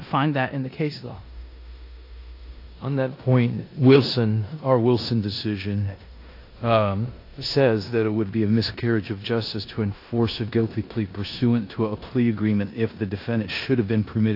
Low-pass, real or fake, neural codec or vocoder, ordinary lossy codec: 5.4 kHz; fake; codec, 16 kHz in and 24 kHz out, 0.9 kbps, LongCat-Audio-Codec, four codebook decoder; AAC, 32 kbps